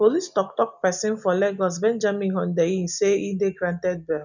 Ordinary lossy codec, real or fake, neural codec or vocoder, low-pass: none; real; none; 7.2 kHz